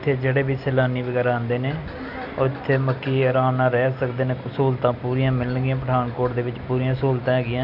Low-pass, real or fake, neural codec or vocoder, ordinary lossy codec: 5.4 kHz; real; none; none